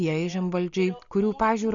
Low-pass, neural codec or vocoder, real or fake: 7.2 kHz; none; real